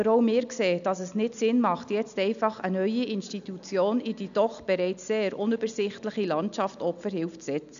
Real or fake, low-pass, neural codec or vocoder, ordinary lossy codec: real; 7.2 kHz; none; none